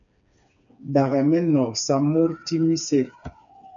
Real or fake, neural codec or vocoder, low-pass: fake; codec, 16 kHz, 4 kbps, FreqCodec, smaller model; 7.2 kHz